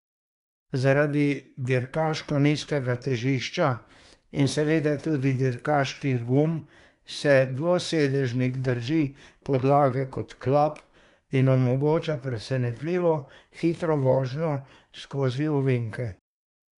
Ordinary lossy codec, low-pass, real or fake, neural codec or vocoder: none; 10.8 kHz; fake; codec, 24 kHz, 1 kbps, SNAC